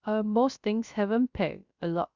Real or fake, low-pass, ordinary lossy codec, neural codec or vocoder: fake; 7.2 kHz; Opus, 64 kbps; codec, 16 kHz, 0.3 kbps, FocalCodec